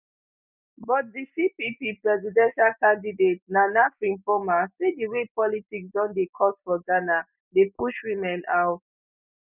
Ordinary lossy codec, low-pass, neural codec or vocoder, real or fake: none; 3.6 kHz; none; real